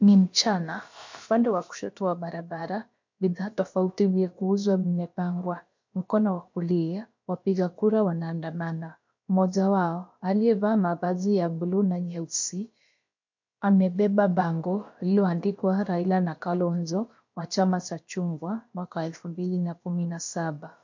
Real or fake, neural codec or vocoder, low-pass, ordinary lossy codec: fake; codec, 16 kHz, about 1 kbps, DyCAST, with the encoder's durations; 7.2 kHz; MP3, 48 kbps